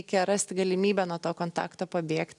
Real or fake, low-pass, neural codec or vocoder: real; 10.8 kHz; none